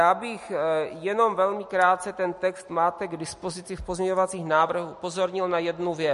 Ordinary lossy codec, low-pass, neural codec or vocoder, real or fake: MP3, 48 kbps; 14.4 kHz; none; real